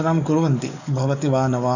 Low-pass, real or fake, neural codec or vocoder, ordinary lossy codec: 7.2 kHz; fake; codec, 44.1 kHz, 7.8 kbps, DAC; none